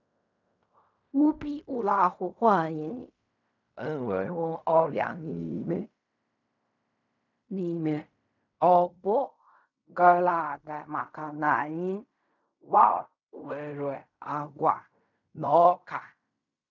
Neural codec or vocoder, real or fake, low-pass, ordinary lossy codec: codec, 16 kHz in and 24 kHz out, 0.4 kbps, LongCat-Audio-Codec, fine tuned four codebook decoder; fake; 7.2 kHz; none